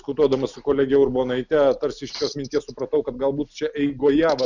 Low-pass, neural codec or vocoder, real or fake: 7.2 kHz; none; real